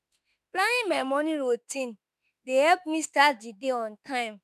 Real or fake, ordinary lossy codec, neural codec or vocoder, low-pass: fake; none; autoencoder, 48 kHz, 32 numbers a frame, DAC-VAE, trained on Japanese speech; 14.4 kHz